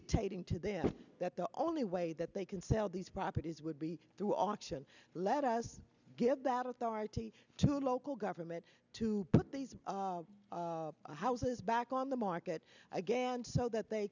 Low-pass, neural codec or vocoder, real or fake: 7.2 kHz; none; real